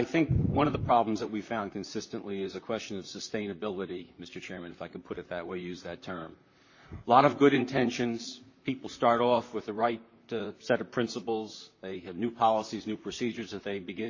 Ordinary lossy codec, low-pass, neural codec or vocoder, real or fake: MP3, 32 kbps; 7.2 kHz; vocoder, 44.1 kHz, 128 mel bands, Pupu-Vocoder; fake